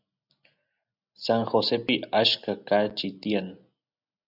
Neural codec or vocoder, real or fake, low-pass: none; real; 5.4 kHz